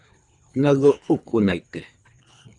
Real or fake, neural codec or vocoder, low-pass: fake; codec, 24 kHz, 3 kbps, HILCodec; 10.8 kHz